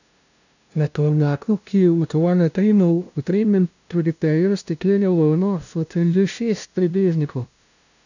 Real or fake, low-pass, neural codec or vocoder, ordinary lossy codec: fake; 7.2 kHz; codec, 16 kHz, 0.5 kbps, FunCodec, trained on LibriTTS, 25 frames a second; none